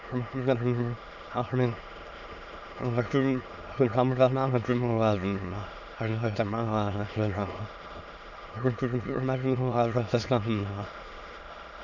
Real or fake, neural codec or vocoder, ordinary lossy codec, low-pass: fake; autoencoder, 22.05 kHz, a latent of 192 numbers a frame, VITS, trained on many speakers; none; 7.2 kHz